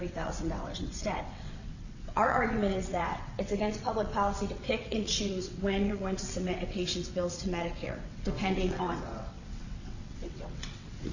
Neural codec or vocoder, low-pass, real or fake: vocoder, 22.05 kHz, 80 mel bands, WaveNeXt; 7.2 kHz; fake